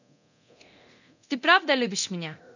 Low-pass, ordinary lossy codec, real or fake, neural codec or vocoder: 7.2 kHz; none; fake; codec, 24 kHz, 0.9 kbps, DualCodec